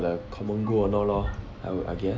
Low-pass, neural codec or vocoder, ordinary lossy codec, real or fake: none; none; none; real